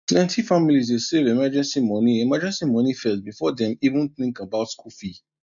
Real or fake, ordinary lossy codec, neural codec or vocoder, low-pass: real; none; none; 7.2 kHz